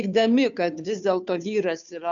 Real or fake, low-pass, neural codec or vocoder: fake; 7.2 kHz; codec, 16 kHz, 2 kbps, FunCodec, trained on Chinese and English, 25 frames a second